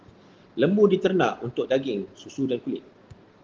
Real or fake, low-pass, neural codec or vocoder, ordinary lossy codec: real; 7.2 kHz; none; Opus, 16 kbps